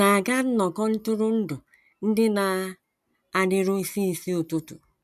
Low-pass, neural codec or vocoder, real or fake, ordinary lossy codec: 14.4 kHz; none; real; none